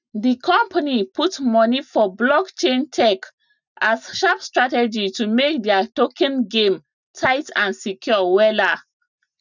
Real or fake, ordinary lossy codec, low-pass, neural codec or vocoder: real; none; 7.2 kHz; none